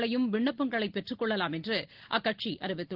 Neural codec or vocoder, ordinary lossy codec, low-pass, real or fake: none; Opus, 16 kbps; 5.4 kHz; real